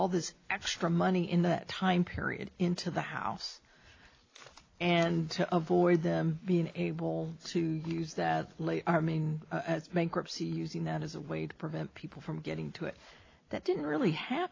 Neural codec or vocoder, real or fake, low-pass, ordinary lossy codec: none; real; 7.2 kHz; AAC, 32 kbps